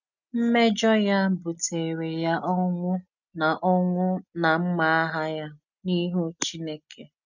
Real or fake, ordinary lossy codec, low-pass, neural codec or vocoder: real; none; 7.2 kHz; none